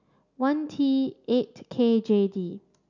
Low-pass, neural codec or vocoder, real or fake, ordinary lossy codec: 7.2 kHz; none; real; none